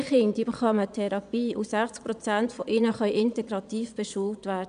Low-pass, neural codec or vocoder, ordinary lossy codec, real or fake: 9.9 kHz; vocoder, 22.05 kHz, 80 mel bands, Vocos; none; fake